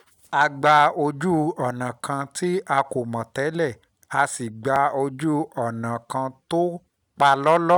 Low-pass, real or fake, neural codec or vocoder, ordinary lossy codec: none; real; none; none